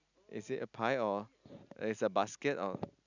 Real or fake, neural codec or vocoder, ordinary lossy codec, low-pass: real; none; none; 7.2 kHz